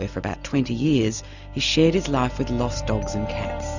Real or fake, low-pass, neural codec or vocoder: real; 7.2 kHz; none